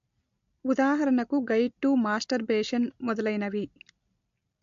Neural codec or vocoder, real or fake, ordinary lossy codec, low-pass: none; real; MP3, 48 kbps; 7.2 kHz